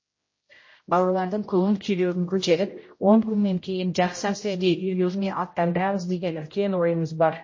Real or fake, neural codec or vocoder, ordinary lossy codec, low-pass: fake; codec, 16 kHz, 0.5 kbps, X-Codec, HuBERT features, trained on general audio; MP3, 32 kbps; 7.2 kHz